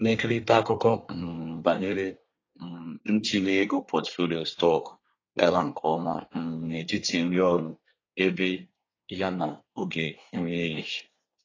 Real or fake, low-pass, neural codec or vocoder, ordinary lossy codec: fake; 7.2 kHz; codec, 24 kHz, 1 kbps, SNAC; AAC, 32 kbps